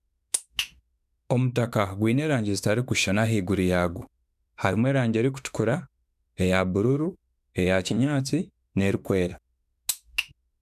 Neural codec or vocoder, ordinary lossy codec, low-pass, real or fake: autoencoder, 48 kHz, 32 numbers a frame, DAC-VAE, trained on Japanese speech; none; 14.4 kHz; fake